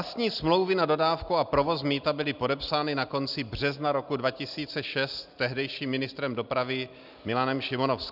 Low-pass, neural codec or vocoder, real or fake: 5.4 kHz; none; real